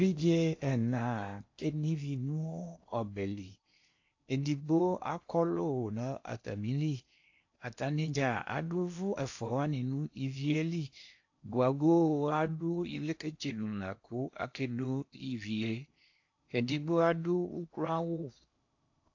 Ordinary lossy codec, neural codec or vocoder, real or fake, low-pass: AAC, 48 kbps; codec, 16 kHz in and 24 kHz out, 0.8 kbps, FocalCodec, streaming, 65536 codes; fake; 7.2 kHz